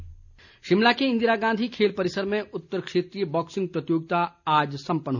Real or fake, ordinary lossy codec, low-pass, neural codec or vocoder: real; none; 7.2 kHz; none